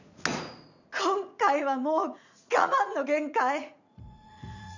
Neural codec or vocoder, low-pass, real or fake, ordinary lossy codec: vocoder, 44.1 kHz, 80 mel bands, Vocos; 7.2 kHz; fake; none